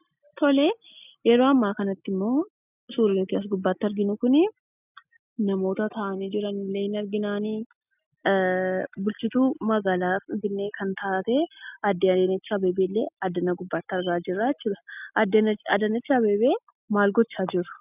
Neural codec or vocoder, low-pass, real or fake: none; 3.6 kHz; real